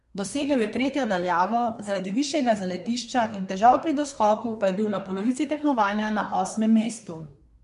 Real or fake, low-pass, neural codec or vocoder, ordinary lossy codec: fake; 10.8 kHz; codec, 24 kHz, 1 kbps, SNAC; MP3, 64 kbps